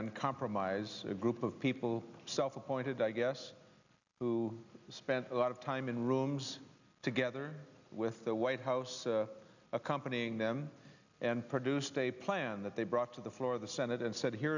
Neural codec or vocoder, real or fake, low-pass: none; real; 7.2 kHz